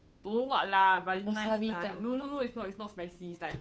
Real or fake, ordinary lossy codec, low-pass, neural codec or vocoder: fake; none; none; codec, 16 kHz, 2 kbps, FunCodec, trained on Chinese and English, 25 frames a second